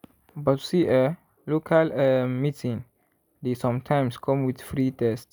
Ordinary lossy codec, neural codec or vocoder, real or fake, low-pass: none; none; real; none